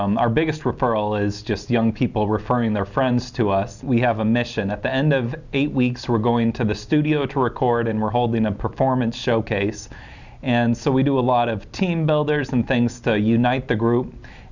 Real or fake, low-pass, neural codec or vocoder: real; 7.2 kHz; none